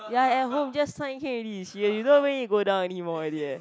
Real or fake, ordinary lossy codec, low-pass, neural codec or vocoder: real; none; none; none